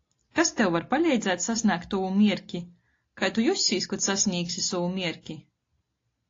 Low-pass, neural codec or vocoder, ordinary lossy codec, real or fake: 7.2 kHz; none; AAC, 32 kbps; real